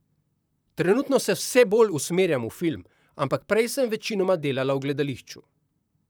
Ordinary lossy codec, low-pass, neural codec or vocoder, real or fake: none; none; vocoder, 44.1 kHz, 128 mel bands, Pupu-Vocoder; fake